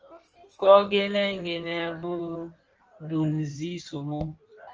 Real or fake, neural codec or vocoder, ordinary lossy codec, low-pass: fake; codec, 16 kHz in and 24 kHz out, 1.1 kbps, FireRedTTS-2 codec; Opus, 24 kbps; 7.2 kHz